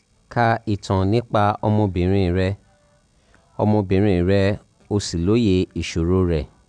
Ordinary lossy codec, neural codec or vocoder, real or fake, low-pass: none; none; real; 9.9 kHz